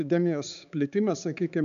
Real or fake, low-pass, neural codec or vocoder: fake; 7.2 kHz; codec, 16 kHz, 4 kbps, X-Codec, HuBERT features, trained on balanced general audio